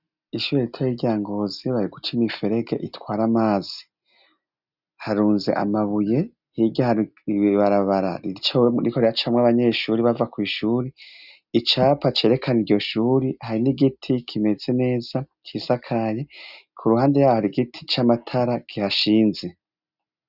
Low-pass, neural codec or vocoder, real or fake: 5.4 kHz; none; real